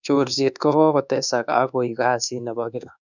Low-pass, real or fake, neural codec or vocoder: 7.2 kHz; fake; codec, 24 kHz, 1.2 kbps, DualCodec